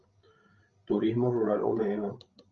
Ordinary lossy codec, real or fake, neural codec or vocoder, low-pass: Opus, 32 kbps; fake; codec, 16 kHz, 16 kbps, FreqCodec, larger model; 7.2 kHz